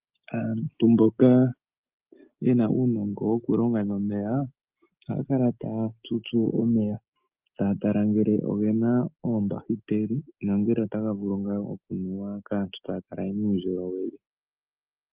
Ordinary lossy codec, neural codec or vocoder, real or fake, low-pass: Opus, 24 kbps; none; real; 3.6 kHz